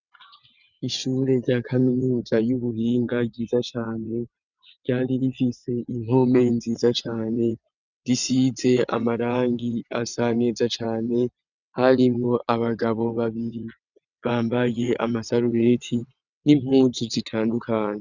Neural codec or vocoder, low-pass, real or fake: vocoder, 22.05 kHz, 80 mel bands, WaveNeXt; 7.2 kHz; fake